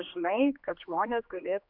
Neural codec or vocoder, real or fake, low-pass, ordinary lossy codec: codec, 16 kHz, 2 kbps, X-Codec, HuBERT features, trained on general audio; fake; 5.4 kHz; MP3, 48 kbps